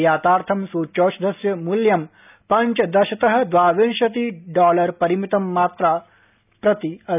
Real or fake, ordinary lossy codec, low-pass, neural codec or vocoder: real; none; 3.6 kHz; none